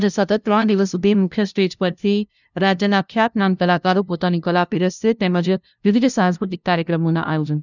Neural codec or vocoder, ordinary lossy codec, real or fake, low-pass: codec, 16 kHz, 0.5 kbps, FunCodec, trained on LibriTTS, 25 frames a second; none; fake; 7.2 kHz